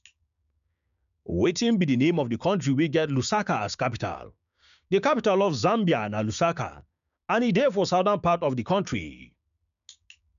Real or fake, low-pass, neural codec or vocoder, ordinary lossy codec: fake; 7.2 kHz; codec, 16 kHz, 6 kbps, DAC; none